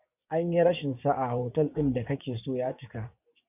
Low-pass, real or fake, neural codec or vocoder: 3.6 kHz; fake; vocoder, 24 kHz, 100 mel bands, Vocos